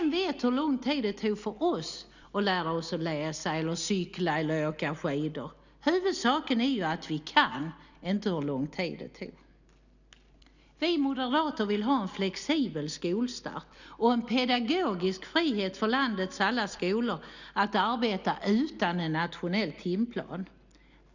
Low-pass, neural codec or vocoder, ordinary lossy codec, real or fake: 7.2 kHz; none; none; real